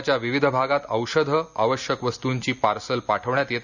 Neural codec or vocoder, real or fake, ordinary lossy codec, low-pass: none; real; none; 7.2 kHz